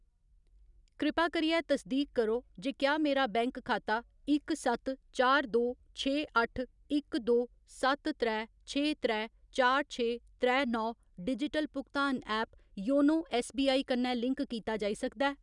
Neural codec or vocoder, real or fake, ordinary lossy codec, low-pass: none; real; none; 10.8 kHz